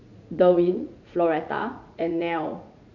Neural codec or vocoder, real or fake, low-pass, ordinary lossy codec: vocoder, 44.1 kHz, 80 mel bands, Vocos; fake; 7.2 kHz; none